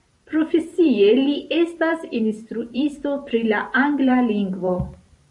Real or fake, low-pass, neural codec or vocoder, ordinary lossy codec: fake; 10.8 kHz; vocoder, 44.1 kHz, 128 mel bands every 512 samples, BigVGAN v2; AAC, 64 kbps